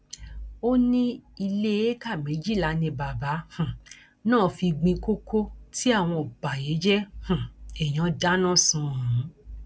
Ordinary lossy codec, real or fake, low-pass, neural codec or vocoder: none; real; none; none